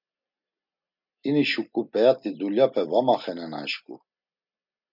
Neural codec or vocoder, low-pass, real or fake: none; 5.4 kHz; real